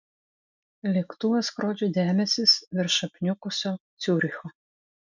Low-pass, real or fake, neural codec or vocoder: 7.2 kHz; real; none